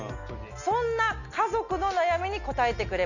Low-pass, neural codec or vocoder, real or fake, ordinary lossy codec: 7.2 kHz; none; real; none